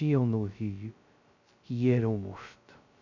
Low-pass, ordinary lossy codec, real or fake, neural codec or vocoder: 7.2 kHz; none; fake; codec, 16 kHz, 0.2 kbps, FocalCodec